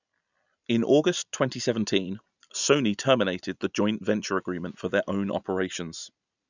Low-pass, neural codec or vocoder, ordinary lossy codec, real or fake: 7.2 kHz; none; none; real